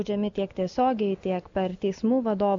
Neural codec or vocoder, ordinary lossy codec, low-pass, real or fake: none; AAC, 64 kbps; 7.2 kHz; real